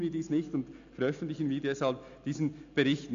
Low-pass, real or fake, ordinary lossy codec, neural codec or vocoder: 7.2 kHz; real; AAC, 64 kbps; none